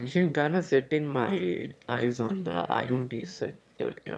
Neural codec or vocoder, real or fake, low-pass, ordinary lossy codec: autoencoder, 22.05 kHz, a latent of 192 numbers a frame, VITS, trained on one speaker; fake; none; none